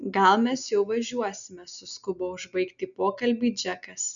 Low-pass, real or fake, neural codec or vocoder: 7.2 kHz; real; none